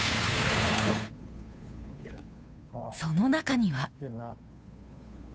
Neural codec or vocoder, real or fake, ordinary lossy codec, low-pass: codec, 16 kHz, 2 kbps, FunCodec, trained on Chinese and English, 25 frames a second; fake; none; none